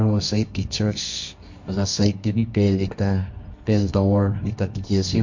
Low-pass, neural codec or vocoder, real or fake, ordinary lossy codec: 7.2 kHz; codec, 24 kHz, 0.9 kbps, WavTokenizer, medium music audio release; fake; MP3, 48 kbps